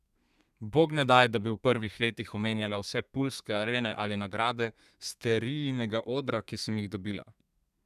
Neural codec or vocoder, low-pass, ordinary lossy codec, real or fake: codec, 32 kHz, 1.9 kbps, SNAC; 14.4 kHz; none; fake